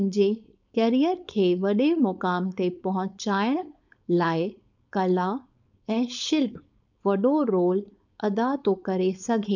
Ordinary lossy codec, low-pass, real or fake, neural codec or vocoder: none; 7.2 kHz; fake; codec, 16 kHz, 4.8 kbps, FACodec